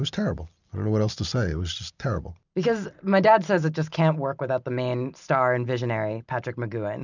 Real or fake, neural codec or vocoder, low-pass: real; none; 7.2 kHz